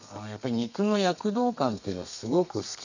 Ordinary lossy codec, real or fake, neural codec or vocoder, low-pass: none; fake; codec, 32 kHz, 1.9 kbps, SNAC; 7.2 kHz